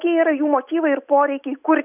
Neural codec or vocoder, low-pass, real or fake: none; 3.6 kHz; real